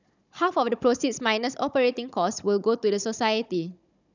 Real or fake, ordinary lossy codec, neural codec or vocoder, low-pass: fake; none; codec, 16 kHz, 16 kbps, FunCodec, trained on Chinese and English, 50 frames a second; 7.2 kHz